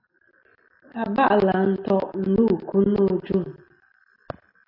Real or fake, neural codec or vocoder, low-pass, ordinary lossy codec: real; none; 5.4 kHz; AAC, 32 kbps